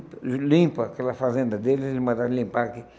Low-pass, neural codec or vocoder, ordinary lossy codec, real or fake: none; none; none; real